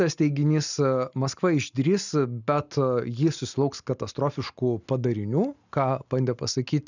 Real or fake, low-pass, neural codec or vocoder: real; 7.2 kHz; none